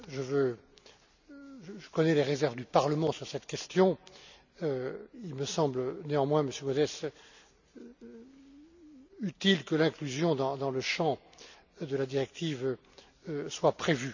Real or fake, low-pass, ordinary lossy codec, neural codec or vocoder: real; 7.2 kHz; none; none